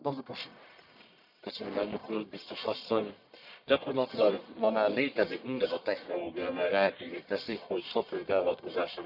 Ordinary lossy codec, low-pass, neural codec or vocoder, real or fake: none; 5.4 kHz; codec, 44.1 kHz, 1.7 kbps, Pupu-Codec; fake